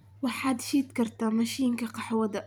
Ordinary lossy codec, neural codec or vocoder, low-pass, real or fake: none; vocoder, 44.1 kHz, 128 mel bands every 512 samples, BigVGAN v2; none; fake